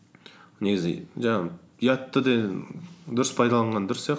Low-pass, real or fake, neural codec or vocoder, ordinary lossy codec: none; real; none; none